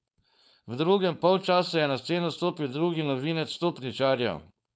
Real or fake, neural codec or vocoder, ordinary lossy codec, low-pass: fake; codec, 16 kHz, 4.8 kbps, FACodec; none; none